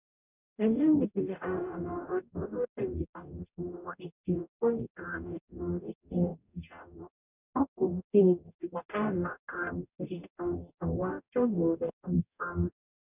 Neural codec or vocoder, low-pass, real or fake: codec, 44.1 kHz, 0.9 kbps, DAC; 3.6 kHz; fake